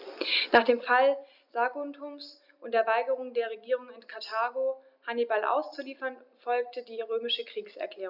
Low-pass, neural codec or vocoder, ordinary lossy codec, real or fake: 5.4 kHz; none; none; real